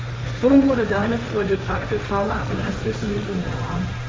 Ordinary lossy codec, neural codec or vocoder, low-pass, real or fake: none; codec, 16 kHz, 1.1 kbps, Voila-Tokenizer; none; fake